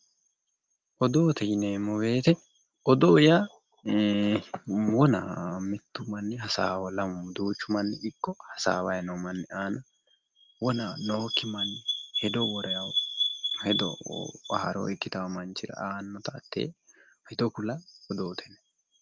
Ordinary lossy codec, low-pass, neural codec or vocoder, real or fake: Opus, 24 kbps; 7.2 kHz; none; real